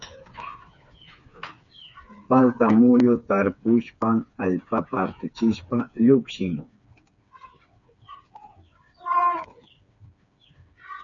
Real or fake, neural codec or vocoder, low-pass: fake; codec, 16 kHz, 4 kbps, FreqCodec, smaller model; 7.2 kHz